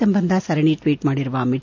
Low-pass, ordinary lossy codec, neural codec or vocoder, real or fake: 7.2 kHz; AAC, 48 kbps; none; real